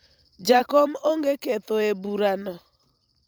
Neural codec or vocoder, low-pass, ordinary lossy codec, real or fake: vocoder, 44.1 kHz, 128 mel bands every 512 samples, BigVGAN v2; 19.8 kHz; none; fake